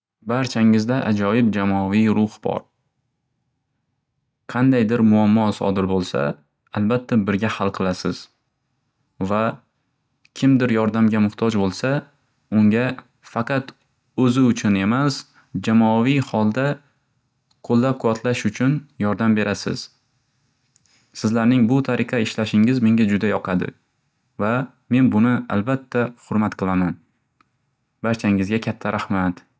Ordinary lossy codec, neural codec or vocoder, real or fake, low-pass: none; none; real; none